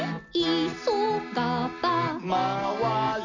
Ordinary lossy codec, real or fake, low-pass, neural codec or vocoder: none; real; 7.2 kHz; none